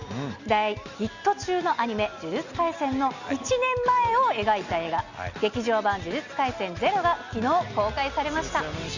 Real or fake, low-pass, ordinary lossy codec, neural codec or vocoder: real; 7.2 kHz; Opus, 64 kbps; none